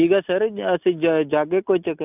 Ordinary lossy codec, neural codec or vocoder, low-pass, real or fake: none; none; 3.6 kHz; real